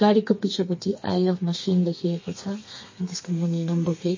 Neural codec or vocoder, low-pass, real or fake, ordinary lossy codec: codec, 32 kHz, 1.9 kbps, SNAC; 7.2 kHz; fake; MP3, 32 kbps